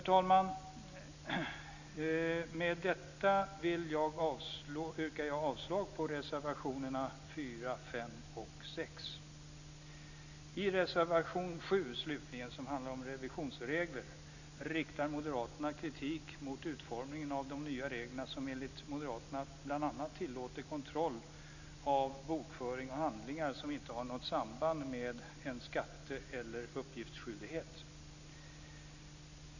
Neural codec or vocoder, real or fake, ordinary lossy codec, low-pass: none; real; none; 7.2 kHz